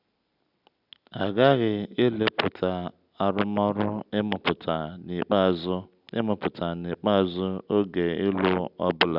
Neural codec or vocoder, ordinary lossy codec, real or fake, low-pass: none; none; real; 5.4 kHz